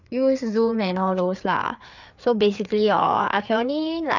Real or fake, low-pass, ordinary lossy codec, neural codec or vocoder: fake; 7.2 kHz; none; codec, 16 kHz, 4 kbps, FreqCodec, larger model